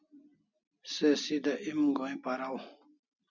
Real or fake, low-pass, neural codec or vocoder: real; 7.2 kHz; none